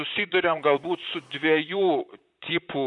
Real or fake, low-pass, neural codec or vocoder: fake; 10.8 kHz; vocoder, 44.1 kHz, 128 mel bands, Pupu-Vocoder